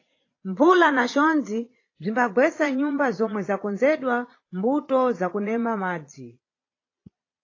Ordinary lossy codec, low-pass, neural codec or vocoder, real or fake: AAC, 32 kbps; 7.2 kHz; vocoder, 22.05 kHz, 80 mel bands, Vocos; fake